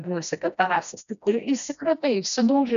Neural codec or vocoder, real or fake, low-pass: codec, 16 kHz, 1 kbps, FreqCodec, smaller model; fake; 7.2 kHz